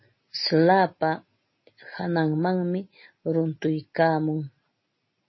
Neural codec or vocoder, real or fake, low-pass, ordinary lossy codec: none; real; 7.2 kHz; MP3, 24 kbps